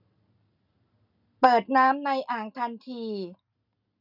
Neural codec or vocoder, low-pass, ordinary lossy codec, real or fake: none; 5.4 kHz; none; real